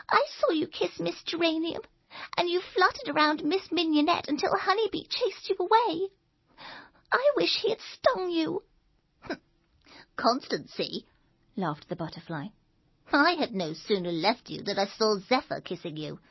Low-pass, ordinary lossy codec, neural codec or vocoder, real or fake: 7.2 kHz; MP3, 24 kbps; none; real